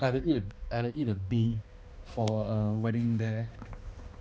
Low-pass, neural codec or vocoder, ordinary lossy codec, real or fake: none; codec, 16 kHz, 2 kbps, X-Codec, HuBERT features, trained on balanced general audio; none; fake